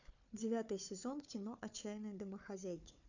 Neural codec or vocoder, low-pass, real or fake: codec, 16 kHz, 4 kbps, FunCodec, trained on Chinese and English, 50 frames a second; 7.2 kHz; fake